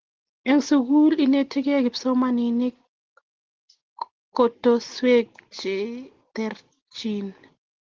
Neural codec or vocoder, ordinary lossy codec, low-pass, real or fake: none; Opus, 16 kbps; 7.2 kHz; real